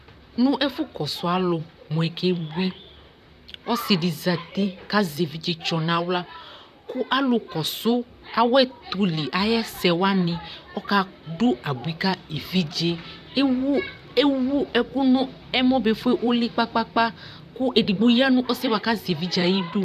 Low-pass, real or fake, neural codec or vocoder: 14.4 kHz; fake; vocoder, 44.1 kHz, 128 mel bands, Pupu-Vocoder